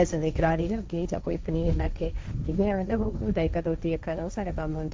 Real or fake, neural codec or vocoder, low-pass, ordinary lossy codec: fake; codec, 16 kHz, 1.1 kbps, Voila-Tokenizer; none; none